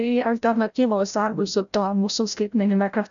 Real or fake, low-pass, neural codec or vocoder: fake; 7.2 kHz; codec, 16 kHz, 0.5 kbps, FreqCodec, larger model